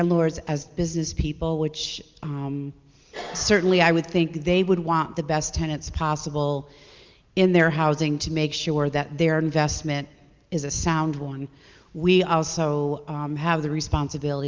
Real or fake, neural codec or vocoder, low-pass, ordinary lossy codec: real; none; 7.2 kHz; Opus, 24 kbps